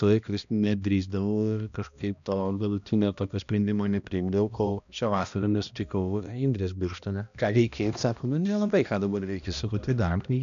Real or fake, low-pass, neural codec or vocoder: fake; 7.2 kHz; codec, 16 kHz, 1 kbps, X-Codec, HuBERT features, trained on balanced general audio